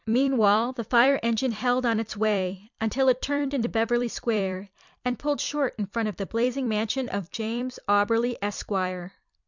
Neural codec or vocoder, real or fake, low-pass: vocoder, 44.1 kHz, 128 mel bands every 256 samples, BigVGAN v2; fake; 7.2 kHz